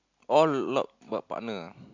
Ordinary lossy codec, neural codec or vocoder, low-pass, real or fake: AAC, 48 kbps; none; 7.2 kHz; real